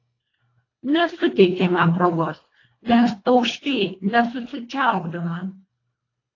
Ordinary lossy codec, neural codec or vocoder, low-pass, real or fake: AAC, 32 kbps; codec, 24 kHz, 1.5 kbps, HILCodec; 7.2 kHz; fake